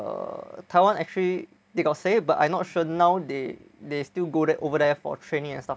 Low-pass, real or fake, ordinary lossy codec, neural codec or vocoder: none; real; none; none